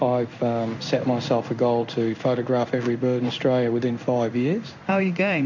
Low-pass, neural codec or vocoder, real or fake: 7.2 kHz; codec, 16 kHz in and 24 kHz out, 1 kbps, XY-Tokenizer; fake